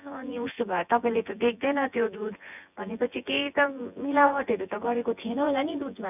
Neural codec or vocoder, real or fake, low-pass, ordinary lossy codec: vocoder, 24 kHz, 100 mel bands, Vocos; fake; 3.6 kHz; none